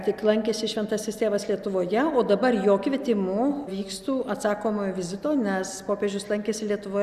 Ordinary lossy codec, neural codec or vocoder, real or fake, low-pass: Opus, 64 kbps; none; real; 14.4 kHz